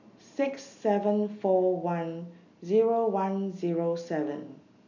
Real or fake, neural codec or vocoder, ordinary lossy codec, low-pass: real; none; none; 7.2 kHz